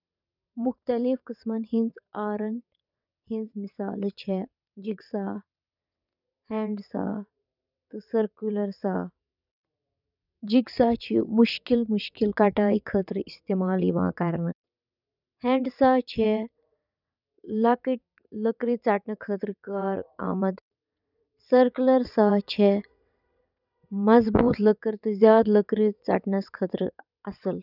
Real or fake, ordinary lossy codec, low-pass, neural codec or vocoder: fake; none; 5.4 kHz; vocoder, 22.05 kHz, 80 mel bands, WaveNeXt